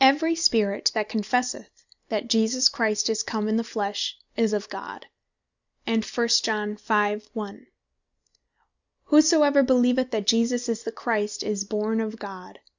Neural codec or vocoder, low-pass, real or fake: none; 7.2 kHz; real